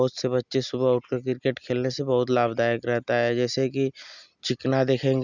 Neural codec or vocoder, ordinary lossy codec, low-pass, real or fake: none; none; 7.2 kHz; real